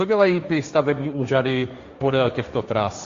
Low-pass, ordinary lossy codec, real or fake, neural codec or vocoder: 7.2 kHz; Opus, 64 kbps; fake; codec, 16 kHz, 1.1 kbps, Voila-Tokenizer